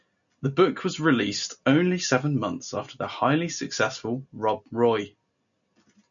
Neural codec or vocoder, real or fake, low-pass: none; real; 7.2 kHz